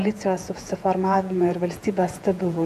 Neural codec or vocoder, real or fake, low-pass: vocoder, 48 kHz, 128 mel bands, Vocos; fake; 14.4 kHz